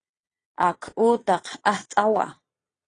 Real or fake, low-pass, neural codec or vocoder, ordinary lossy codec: fake; 9.9 kHz; vocoder, 22.05 kHz, 80 mel bands, Vocos; AAC, 32 kbps